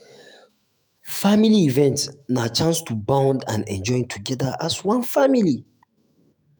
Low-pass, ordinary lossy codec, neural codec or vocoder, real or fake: none; none; autoencoder, 48 kHz, 128 numbers a frame, DAC-VAE, trained on Japanese speech; fake